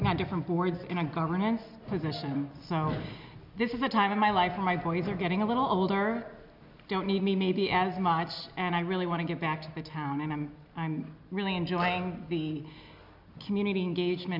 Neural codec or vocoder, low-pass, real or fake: none; 5.4 kHz; real